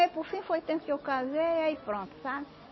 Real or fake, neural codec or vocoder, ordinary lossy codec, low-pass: real; none; MP3, 24 kbps; 7.2 kHz